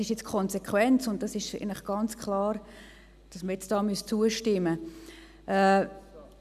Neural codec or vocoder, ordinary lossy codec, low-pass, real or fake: none; none; 14.4 kHz; real